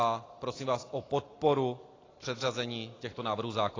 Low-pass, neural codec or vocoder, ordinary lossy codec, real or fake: 7.2 kHz; none; AAC, 32 kbps; real